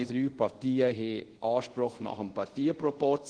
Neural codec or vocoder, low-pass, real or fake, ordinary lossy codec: codec, 24 kHz, 0.5 kbps, DualCodec; 9.9 kHz; fake; Opus, 16 kbps